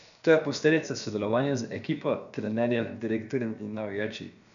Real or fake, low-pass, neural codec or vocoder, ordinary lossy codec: fake; 7.2 kHz; codec, 16 kHz, about 1 kbps, DyCAST, with the encoder's durations; none